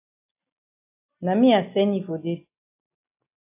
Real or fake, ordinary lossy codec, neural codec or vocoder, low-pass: real; AAC, 24 kbps; none; 3.6 kHz